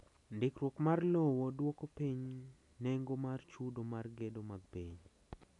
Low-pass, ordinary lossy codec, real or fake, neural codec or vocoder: 10.8 kHz; none; real; none